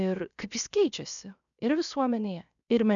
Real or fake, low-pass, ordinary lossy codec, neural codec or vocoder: fake; 7.2 kHz; MP3, 96 kbps; codec, 16 kHz, 0.7 kbps, FocalCodec